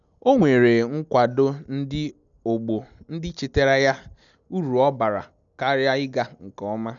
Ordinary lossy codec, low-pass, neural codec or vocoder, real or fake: none; 7.2 kHz; none; real